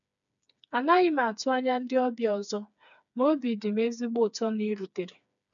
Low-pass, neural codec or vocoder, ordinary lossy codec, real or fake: 7.2 kHz; codec, 16 kHz, 4 kbps, FreqCodec, smaller model; none; fake